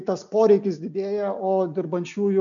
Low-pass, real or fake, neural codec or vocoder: 7.2 kHz; real; none